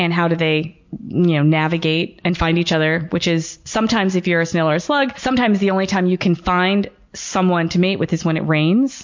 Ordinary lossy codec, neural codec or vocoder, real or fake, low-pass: MP3, 48 kbps; none; real; 7.2 kHz